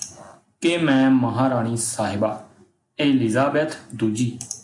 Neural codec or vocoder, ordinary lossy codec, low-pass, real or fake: none; AAC, 48 kbps; 10.8 kHz; real